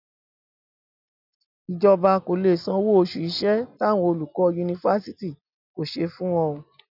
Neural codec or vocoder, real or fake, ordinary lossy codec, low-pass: none; real; none; 5.4 kHz